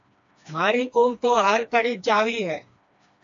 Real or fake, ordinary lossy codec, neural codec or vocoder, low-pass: fake; AAC, 64 kbps; codec, 16 kHz, 2 kbps, FreqCodec, smaller model; 7.2 kHz